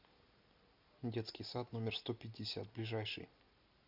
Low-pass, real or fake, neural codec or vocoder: 5.4 kHz; real; none